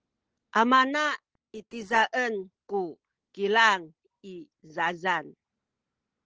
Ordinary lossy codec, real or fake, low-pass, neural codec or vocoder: Opus, 16 kbps; real; 7.2 kHz; none